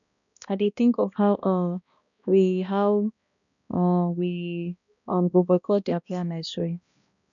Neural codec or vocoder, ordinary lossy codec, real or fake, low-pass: codec, 16 kHz, 1 kbps, X-Codec, HuBERT features, trained on balanced general audio; none; fake; 7.2 kHz